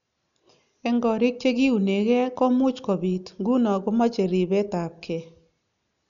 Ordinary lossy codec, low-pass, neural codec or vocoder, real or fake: none; 7.2 kHz; none; real